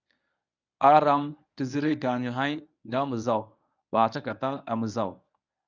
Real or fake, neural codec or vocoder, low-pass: fake; codec, 24 kHz, 0.9 kbps, WavTokenizer, medium speech release version 1; 7.2 kHz